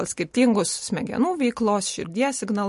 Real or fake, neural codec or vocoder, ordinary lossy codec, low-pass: real; none; MP3, 48 kbps; 14.4 kHz